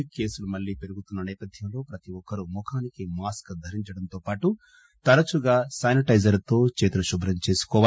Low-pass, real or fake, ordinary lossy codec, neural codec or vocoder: none; real; none; none